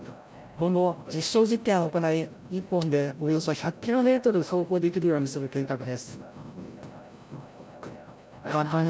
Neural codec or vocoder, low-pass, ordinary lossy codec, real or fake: codec, 16 kHz, 0.5 kbps, FreqCodec, larger model; none; none; fake